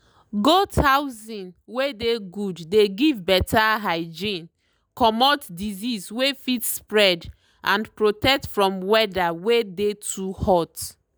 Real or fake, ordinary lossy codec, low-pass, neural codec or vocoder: real; none; none; none